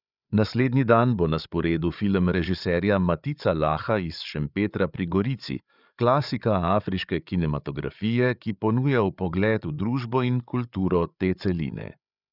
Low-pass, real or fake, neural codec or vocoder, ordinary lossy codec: 5.4 kHz; fake; codec, 16 kHz, 8 kbps, FreqCodec, larger model; none